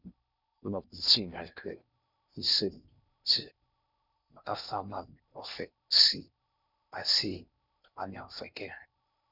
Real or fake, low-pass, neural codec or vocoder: fake; 5.4 kHz; codec, 16 kHz in and 24 kHz out, 0.6 kbps, FocalCodec, streaming, 4096 codes